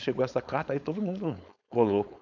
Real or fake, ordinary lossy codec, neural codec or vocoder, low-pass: fake; none; codec, 16 kHz, 4.8 kbps, FACodec; 7.2 kHz